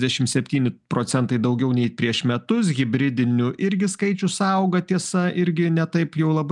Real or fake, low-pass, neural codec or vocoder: real; 10.8 kHz; none